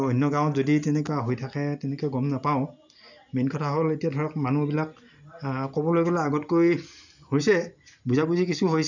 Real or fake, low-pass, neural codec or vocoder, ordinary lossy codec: real; 7.2 kHz; none; none